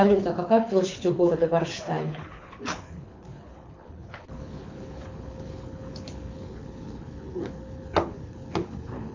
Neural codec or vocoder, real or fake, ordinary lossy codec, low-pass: codec, 24 kHz, 6 kbps, HILCodec; fake; MP3, 64 kbps; 7.2 kHz